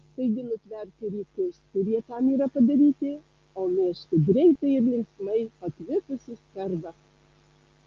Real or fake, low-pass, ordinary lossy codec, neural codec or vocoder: real; 7.2 kHz; Opus, 32 kbps; none